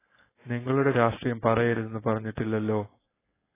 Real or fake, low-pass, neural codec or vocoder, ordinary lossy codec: fake; 3.6 kHz; codec, 16 kHz, 4.8 kbps, FACodec; AAC, 16 kbps